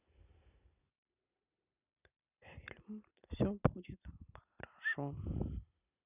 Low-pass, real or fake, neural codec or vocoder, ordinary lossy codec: 3.6 kHz; fake; vocoder, 44.1 kHz, 128 mel bands every 256 samples, BigVGAN v2; none